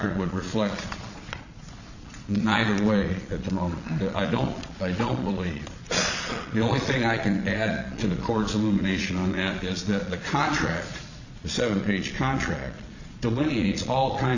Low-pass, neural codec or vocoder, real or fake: 7.2 kHz; vocoder, 22.05 kHz, 80 mel bands, Vocos; fake